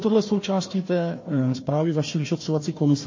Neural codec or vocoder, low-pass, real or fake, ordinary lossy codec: codec, 16 kHz, 1 kbps, FunCodec, trained on Chinese and English, 50 frames a second; 7.2 kHz; fake; MP3, 32 kbps